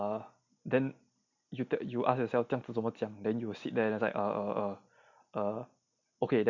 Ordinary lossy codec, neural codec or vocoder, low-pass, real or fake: none; none; 7.2 kHz; real